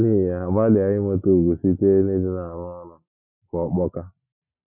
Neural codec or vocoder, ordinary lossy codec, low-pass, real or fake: none; none; 3.6 kHz; real